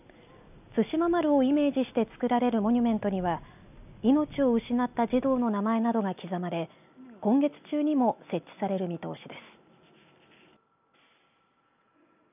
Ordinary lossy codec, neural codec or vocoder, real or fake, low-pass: none; none; real; 3.6 kHz